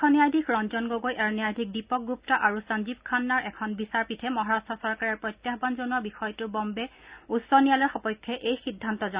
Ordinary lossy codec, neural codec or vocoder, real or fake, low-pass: Opus, 64 kbps; none; real; 3.6 kHz